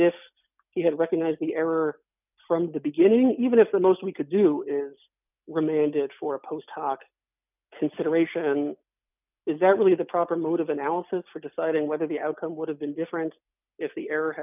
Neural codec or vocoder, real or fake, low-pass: none; real; 3.6 kHz